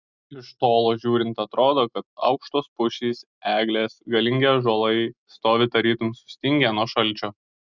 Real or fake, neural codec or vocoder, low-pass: real; none; 7.2 kHz